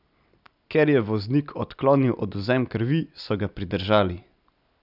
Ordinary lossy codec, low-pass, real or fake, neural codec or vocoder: none; 5.4 kHz; real; none